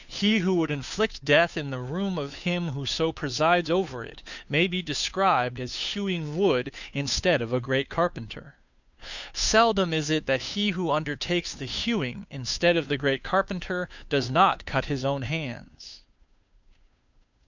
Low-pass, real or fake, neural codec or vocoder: 7.2 kHz; fake; codec, 16 kHz, 2 kbps, FunCodec, trained on Chinese and English, 25 frames a second